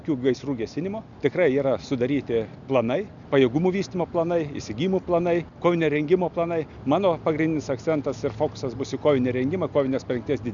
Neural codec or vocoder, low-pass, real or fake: none; 7.2 kHz; real